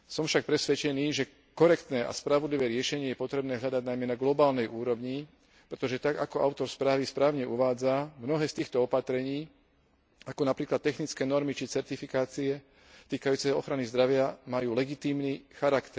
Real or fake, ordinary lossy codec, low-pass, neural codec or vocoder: real; none; none; none